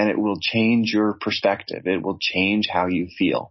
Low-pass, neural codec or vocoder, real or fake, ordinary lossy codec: 7.2 kHz; none; real; MP3, 24 kbps